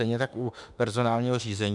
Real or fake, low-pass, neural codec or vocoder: fake; 10.8 kHz; autoencoder, 48 kHz, 32 numbers a frame, DAC-VAE, trained on Japanese speech